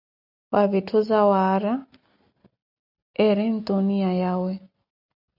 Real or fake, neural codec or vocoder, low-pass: real; none; 5.4 kHz